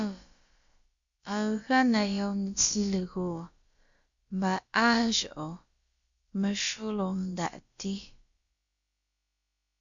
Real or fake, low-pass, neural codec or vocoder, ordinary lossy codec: fake; 7.2 kHz; codec, 16 kHz, about 1 kbps, DyCAST, with the encoder's durations; Opus, 64 kbps